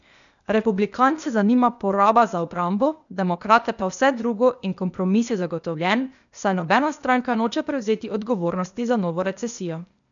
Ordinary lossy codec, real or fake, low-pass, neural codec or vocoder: none; fake; 7.2 kHz; codec, 16 kHz, 0.8 kbps, ZipCodec